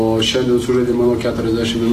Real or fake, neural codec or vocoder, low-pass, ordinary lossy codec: real; none; 14.4 kHz; AAC, 48 kbps